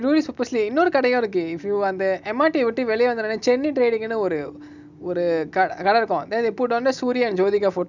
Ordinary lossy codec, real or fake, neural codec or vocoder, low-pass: none; real; none; 7.2 kHz